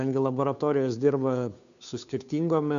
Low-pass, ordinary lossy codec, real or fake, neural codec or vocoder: 7.2 kHz; MP3, 96 kbps; fake; codec, 16 kHz, 2 kbps, FunCodec, trained on Chinese and English, 25 frames a second